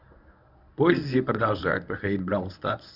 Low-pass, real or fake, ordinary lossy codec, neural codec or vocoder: 5.4 kHz; fake; Opus, 64 kbps; codec, 24 kHz, 0.9 kbps, WavTokenizer, medium speech release version 1